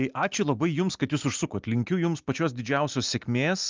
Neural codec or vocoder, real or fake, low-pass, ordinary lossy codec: none; real; 7.2 kHz; Opus, 32 kbps